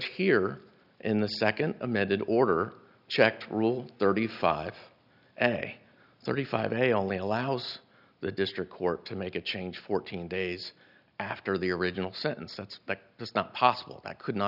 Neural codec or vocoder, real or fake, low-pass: none; real; 5.4 kHz